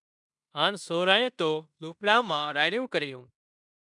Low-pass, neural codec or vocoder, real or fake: 10.8 kHz; codec, 16 kHz in and 24 kHz out, 0.9 kbps, LongCat-Audio-Codec, fine tuned four codebook decoder; fake